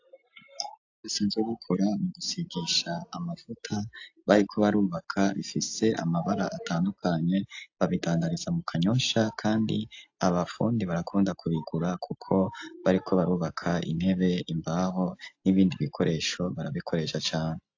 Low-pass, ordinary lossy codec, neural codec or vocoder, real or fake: 7.2 kHz; AAC, 48 kbps; none; real